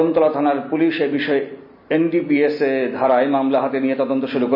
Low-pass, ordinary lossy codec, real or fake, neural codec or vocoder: 5.4 kHz; AAC, 48 kbps; real; none